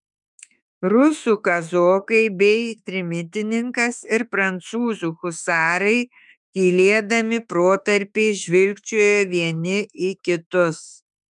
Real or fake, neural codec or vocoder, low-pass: fake; autoencoder, 48 kHz, 32 numbers a frame, DAC-VAE, trained on Japanese speech; 10.8 kHz